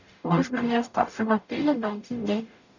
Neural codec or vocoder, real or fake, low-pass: codec, 44.1 kHz, 0.9 kbps, DAC; fake; 7.2 kHz